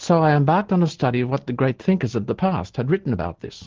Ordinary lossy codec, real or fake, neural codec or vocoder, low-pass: Opus, 16 kbps; real; none; 7.2 kHz